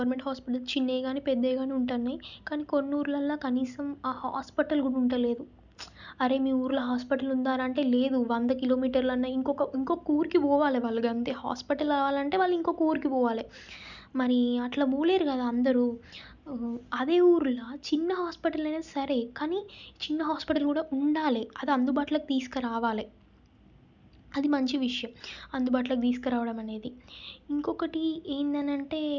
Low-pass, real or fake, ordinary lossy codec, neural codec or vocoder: 7.2 kHz; real; none; none